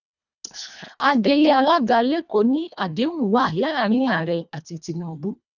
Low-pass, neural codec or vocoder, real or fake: 7.2 kHz; codec, 24 kHz, 1.5 kbps, HILCodec; fake